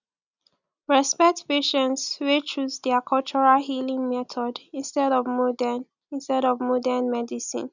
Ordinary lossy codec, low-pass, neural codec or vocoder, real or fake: none; 7.2 kHz; none; real